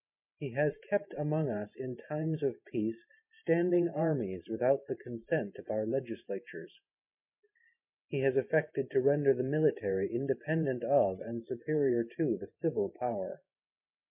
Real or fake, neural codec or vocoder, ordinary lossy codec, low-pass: fake; vocoder, 44.1 kHz, 128 mel bands every 512 samples, BigVGAN v2; MP3, 32 kbps; 3.6 kHz